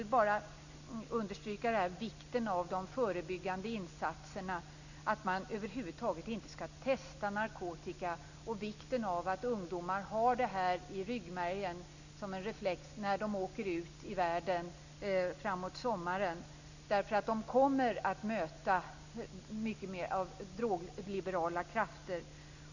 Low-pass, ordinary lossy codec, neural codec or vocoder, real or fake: 7.2 kHz; none; none; real